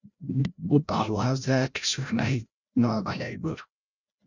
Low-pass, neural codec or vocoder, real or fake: 7.2 kHz; codec, 16 kHz, 0.5 kbps, FreqCodec, larger model; fake